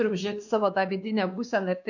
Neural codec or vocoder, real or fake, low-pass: codec, 16 kHz, 2 kbps, X-Codec, WavLM features, trained on Multilingual LibriSpeech; fake; 7.2 kHz